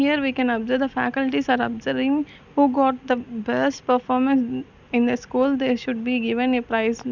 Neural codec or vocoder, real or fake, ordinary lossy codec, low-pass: none; real; none; 7.2 kHz